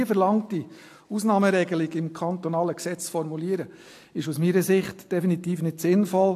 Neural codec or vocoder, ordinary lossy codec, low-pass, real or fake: none; AAC, 64 kbps; 14.4 kHz; real